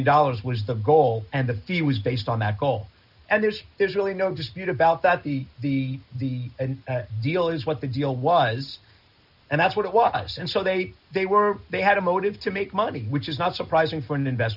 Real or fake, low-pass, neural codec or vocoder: real; 5.4 kHz; none